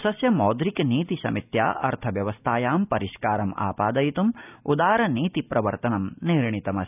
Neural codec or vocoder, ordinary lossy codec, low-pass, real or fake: none; none; 3.6 kHz; real